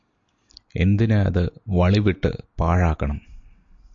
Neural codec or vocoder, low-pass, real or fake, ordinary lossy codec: none; 7.2 kHz; real; AAC, 64 kbps